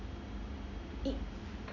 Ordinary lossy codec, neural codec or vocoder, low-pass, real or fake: none; none; 7.2 kHz; real